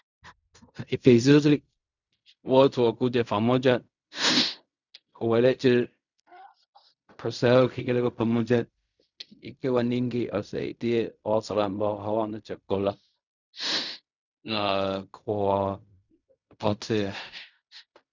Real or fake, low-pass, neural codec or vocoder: fake; 7.2 kHz; codec, 16 kHz in and 24 kHz out, 0.4 kbps, LongCat-Audio-Codec, fine tuned four codebook decoder